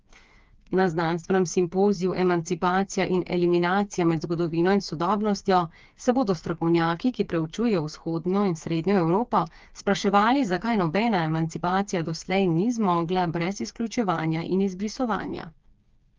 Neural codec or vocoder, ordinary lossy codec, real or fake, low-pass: codec, 16 kHz, 4 kbps, FreqCodec, smaller model; Opus, 24 kbps; fake; 7.2 kHz